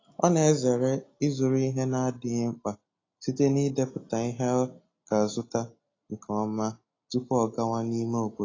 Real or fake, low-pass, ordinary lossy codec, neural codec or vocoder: real; 7.2 kHz; AAC, 32 kbps; none